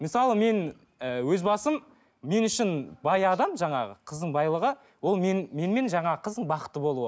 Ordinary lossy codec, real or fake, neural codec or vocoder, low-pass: none; real; none; none